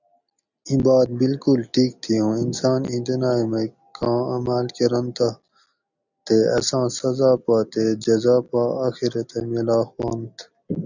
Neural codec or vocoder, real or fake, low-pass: none; real; 7.2 kHz